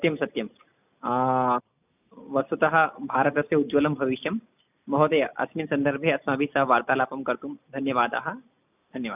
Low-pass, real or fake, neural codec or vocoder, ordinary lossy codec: 3.6 kHz; real; none; none